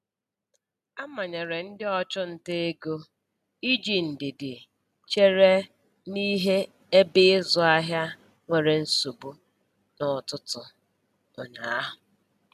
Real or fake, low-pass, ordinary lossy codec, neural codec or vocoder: real; 14.4 kHz; none; none